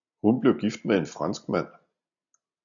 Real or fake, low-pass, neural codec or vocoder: real; 7.2 kHz; none